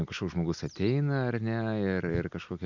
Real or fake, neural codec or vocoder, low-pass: real; none; 7.2 kHz